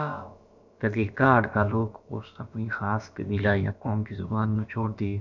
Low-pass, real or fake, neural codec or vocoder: 7.2 kHz; fake; codec, 16 kHz, about 1 kbps, DyCAST, with the encoder's durations